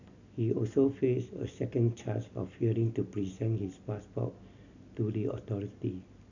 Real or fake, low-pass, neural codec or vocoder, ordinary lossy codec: real; 7.2 kHz; none; none